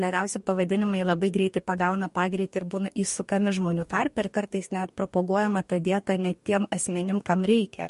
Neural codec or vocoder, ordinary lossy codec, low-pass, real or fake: codec, 44.1 kHz, 2.6 kbps, DAC; MP3, 48 kbps; 14.4 kHz; fake